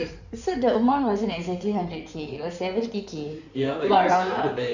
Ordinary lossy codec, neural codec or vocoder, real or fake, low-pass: none; codec, 16 kHz in and 24 kHz out, 2.2 kbps, FireRedTTS-2 codec; fake; 7.2 kHz